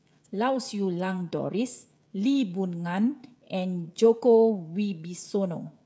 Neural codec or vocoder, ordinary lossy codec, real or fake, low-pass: codec, 16 kHz, 16 kbps, FreqCodec, smaller model; none; fake; none